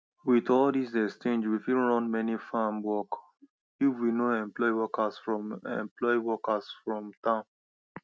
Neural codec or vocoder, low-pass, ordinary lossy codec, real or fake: none; none; none; real